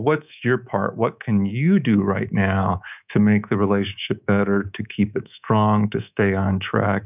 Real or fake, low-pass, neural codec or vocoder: fake; 3.6 kHz; codec, 24 kHz, 3.1 kbps, DualCodec